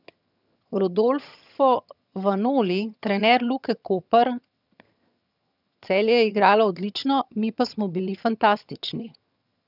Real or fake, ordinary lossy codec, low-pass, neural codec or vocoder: fake; none; 5.4 kHz; vocoder, 22.05 kHz, 80 mel bands, HiFi-GAN